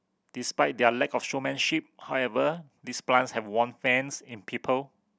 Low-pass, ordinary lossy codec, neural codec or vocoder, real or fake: none; none; none; real